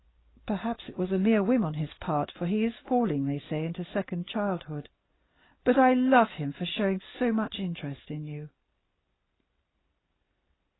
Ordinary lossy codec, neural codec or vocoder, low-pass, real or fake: AAC, 16 kbps; none; 7.2 kHz; real